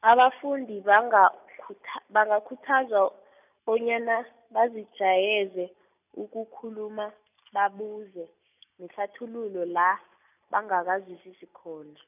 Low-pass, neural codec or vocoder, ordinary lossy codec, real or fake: 3.6 kHz; none; none; real